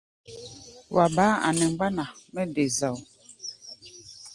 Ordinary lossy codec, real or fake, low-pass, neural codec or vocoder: Opus, 24 kbps; real; 10.8 kHz; none